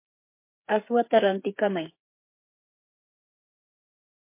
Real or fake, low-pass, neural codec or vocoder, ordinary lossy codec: fake; 3.6 kHz; codec, 16 kHz, 8 kbps, FreqCodec, smaller model; MP3, 24 kbps